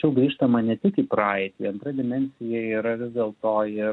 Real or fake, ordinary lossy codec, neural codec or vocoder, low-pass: real; MP3, 64 kbps; none; 10.8 kHz